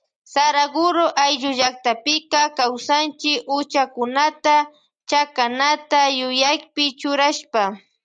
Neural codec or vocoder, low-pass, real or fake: none; 9.9 kHz; real